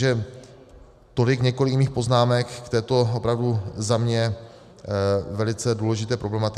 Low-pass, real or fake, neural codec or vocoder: 14.4 kHz; real; none